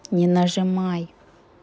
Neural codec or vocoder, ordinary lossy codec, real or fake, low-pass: none; none; real; none